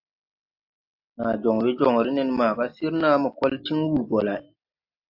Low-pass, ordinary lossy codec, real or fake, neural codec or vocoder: 5.4 kHz; AAC, 48 kbps; real; none